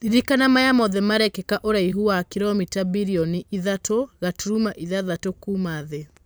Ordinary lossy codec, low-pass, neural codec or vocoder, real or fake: none; none; vocoder, 44.1 kHz, 128 mel bands every 512 samples, BigVGAN v2; fake